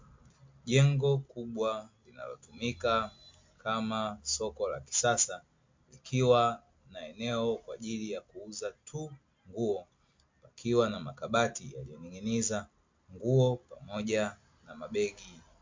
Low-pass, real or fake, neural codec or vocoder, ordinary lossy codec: 7.2 kHz; real; none; MP3, 48 kbps